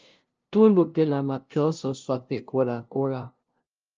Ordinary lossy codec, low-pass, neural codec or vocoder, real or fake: Opus, 24 kbps; 7.2 kHz; codec, 16 kHz, 0.5 kbps, FunCodec, trained on LibriTTS, 25 frames a second; fake